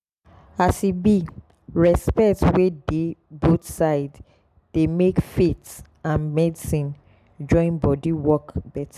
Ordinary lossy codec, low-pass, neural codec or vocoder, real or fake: none; 14.4 kHz; none; real